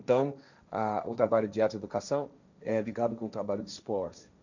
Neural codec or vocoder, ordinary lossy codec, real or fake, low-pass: codec, 16 kHz, 1.1 kbps, Voila-Tokenizer; none; fake; 7.2 kHz